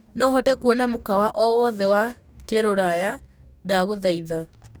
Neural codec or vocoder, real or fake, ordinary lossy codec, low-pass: codec, 44.1 kHz, 2.6 kbps, DAC; fake; none; none